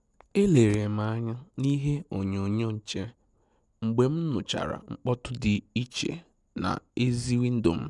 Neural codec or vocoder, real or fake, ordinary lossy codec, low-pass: none; real; none; 10.8 kHz